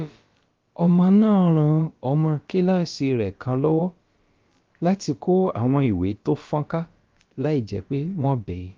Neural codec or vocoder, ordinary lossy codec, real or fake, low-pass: codec, 16 kHz, about 1 kbps, DyCAST, with the encoder's durations; Opus, 32 kbps; fake; 7.2 kHz